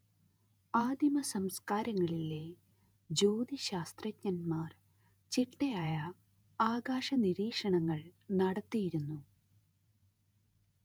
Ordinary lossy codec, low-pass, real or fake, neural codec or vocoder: none; none; fake; vocoder, 48 kHz, 128 mel bands, Vocos